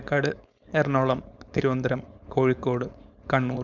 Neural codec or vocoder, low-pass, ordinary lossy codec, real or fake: codec, 16 kHz, 4.8 kbps, FACodec; 7.2 kHz; none; fake